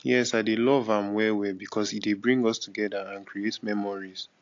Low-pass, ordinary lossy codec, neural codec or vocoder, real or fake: 7.2 kHz; AAC, 48 kbps; none; real